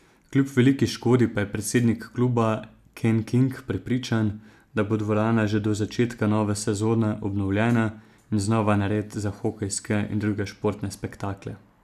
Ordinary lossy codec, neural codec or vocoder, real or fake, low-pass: none; none; real; 14.4 kHz